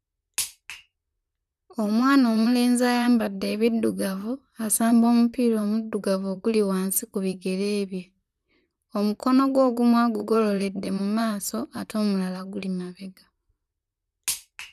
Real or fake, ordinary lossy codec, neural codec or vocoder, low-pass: fake; none; vocoder, 44.1 kHz, 128 mel bands, Pupu-Vocoder; 14.4 kHz